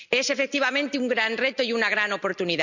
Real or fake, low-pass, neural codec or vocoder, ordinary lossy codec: real; 7.2 kHz; none; none